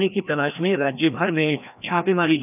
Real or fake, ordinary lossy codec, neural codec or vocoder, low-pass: fake; none; codec, 16 kHz, 1 kbps, FreqCodec, larger model; 3.6 kHz